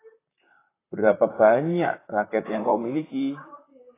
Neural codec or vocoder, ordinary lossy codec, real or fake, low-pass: vocoder, 44.1 kHz, 128 mel bands, Pupu-Vocoder; AAC, 16 kbps; fake; 3.6 kHz